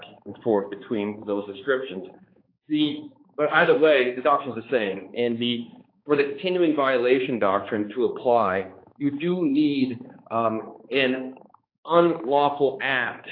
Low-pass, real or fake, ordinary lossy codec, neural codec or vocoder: 5.4 kHz; fake; AAC, 32 kbps; codec, 16 kHz, 2 kbps, X-Codec, HuBERT features, trained on balanced general audio